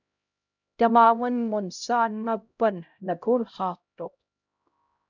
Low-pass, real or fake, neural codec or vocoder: 7.2 kHz; fake; codec, 16 kHz, 0.5 kbps, X-Codec, HuBERT features, trained on LibriSpeech